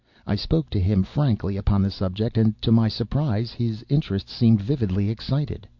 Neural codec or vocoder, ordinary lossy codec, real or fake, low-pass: none; MP3, 48 kbps; real; 7.2 kHz